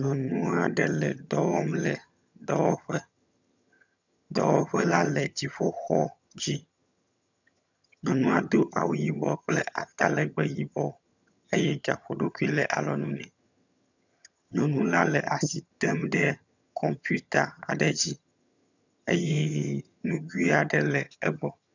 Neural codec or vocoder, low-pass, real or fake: vocoder, 22.05 kHz, 80 mel bands, HiFi-GAN; 7.2 kHz; fake